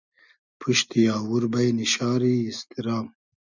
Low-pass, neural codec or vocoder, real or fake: 7.2 kHz; none; real